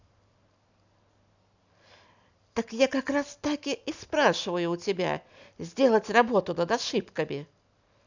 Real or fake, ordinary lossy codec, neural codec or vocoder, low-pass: real; none; none; 7.2 kHz